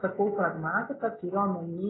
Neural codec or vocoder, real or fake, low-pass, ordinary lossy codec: none; real; 7.2 kHz; AAC, 16 kbps